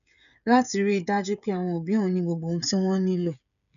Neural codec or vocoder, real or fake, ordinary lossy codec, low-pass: codec, 16 kHz, 16 kbps, FreqCodec, smaller model; fake; none; 7.2 kHz